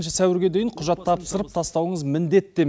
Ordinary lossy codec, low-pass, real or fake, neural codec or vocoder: none; none; real; none